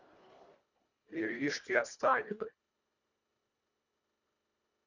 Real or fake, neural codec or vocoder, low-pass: fake; codec, 24 kHz, 1.5 kbps, HILCodec; 7.2 kHz